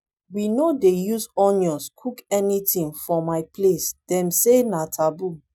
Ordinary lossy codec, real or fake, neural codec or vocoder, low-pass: none; real; none; 19.8 kHz